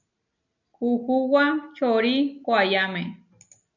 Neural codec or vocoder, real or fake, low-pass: none; real; 7.2 kHz